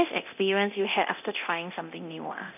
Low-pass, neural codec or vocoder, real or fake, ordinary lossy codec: 3.6 kHz; codec, 24 kHz, 0.9 kbps, DualCodec; fake; none